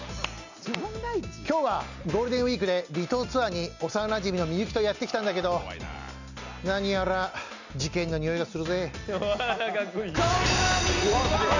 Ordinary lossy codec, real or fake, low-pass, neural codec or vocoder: none; real; 7.2 kHz; none